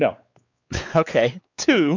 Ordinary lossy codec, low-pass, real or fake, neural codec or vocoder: AAC, 48 kbps; 7.2 kHz; fake; codec, 16 kHz, 4 kbps, X-Codec, WavLM features, trained on Multilingual LibriSpeech